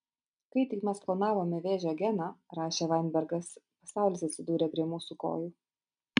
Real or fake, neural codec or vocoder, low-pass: real; none; 9.9 kHz